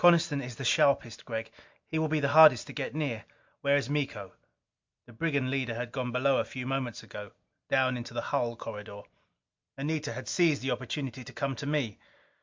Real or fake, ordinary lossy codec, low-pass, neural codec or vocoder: real; MP3, 64 kbps; 7.2 kHz; none